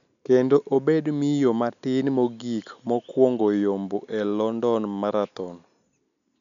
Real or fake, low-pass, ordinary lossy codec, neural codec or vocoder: real; 7.2 kHz; none; none